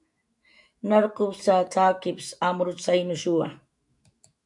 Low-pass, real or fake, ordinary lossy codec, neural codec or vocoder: 10.8 kHz; fake; MP3, 64 kbps; autoencoder, 48 kHz, 128 numbers a frame, DAC-VAE, trained on Japanese speech